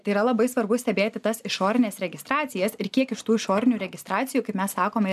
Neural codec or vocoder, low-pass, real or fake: none; 14.4 kHz; real